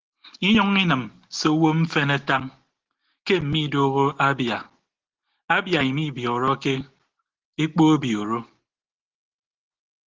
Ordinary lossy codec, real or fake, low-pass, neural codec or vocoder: Opus, 16 kbps; real; 7.2 kHz; none